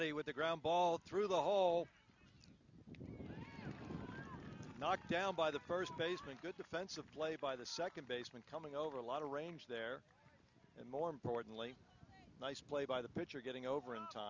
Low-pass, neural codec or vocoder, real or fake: 7.2 kHz; none; real